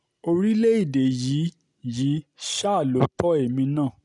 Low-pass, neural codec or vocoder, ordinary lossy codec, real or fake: 10.8 kHz; none; none; real